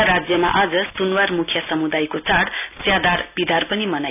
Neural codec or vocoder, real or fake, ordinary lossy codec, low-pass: none; real; none; 3.6 kHz